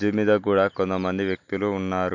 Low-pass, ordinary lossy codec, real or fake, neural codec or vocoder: 7.2 kHz; MP3, 48 kbps; real; none